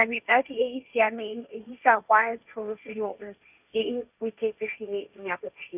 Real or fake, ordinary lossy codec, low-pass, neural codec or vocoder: fake; none; 3.6 kHz; codec, 16 kHz, 1.1 kbps, Voila-Tokenizer